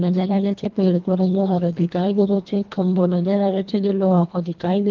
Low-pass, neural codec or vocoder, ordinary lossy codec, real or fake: 7.2 kHz; codec, 24 kHz, 1.5 kbps, HILCodec; Opus, 32 kbps; fake